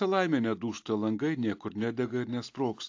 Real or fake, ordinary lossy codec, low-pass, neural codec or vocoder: real; AAC, 48 kbps; 7.2 kHz; none